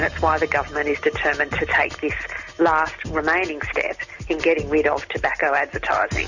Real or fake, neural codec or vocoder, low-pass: real; none; 7.2 kHz